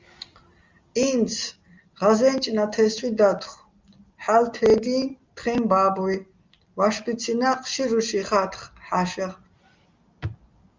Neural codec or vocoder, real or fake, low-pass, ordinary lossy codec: none; real; 7.2 kHz; Opus, 32 kbps